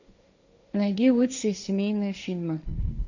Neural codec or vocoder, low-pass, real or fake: codec, 16 kHz, 1.1 kbps, Voila-Tokenizer; 7.2 kHz; fake